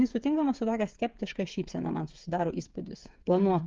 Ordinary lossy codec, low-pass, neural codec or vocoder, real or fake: Opus, 32 kbps; 7.2 kHz; codec, 16 kHz, 8 kbps, FreqCodec, smaller model; fake